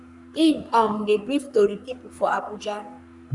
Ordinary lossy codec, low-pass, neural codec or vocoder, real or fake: none; 10.8 kHz; codec, 44.1 kHz, 3.4 kbps, Pupu-Codec; fake